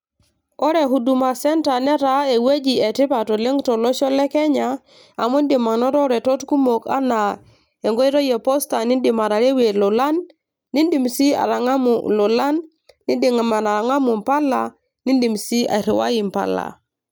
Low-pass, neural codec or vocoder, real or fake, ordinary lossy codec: none; none; real; none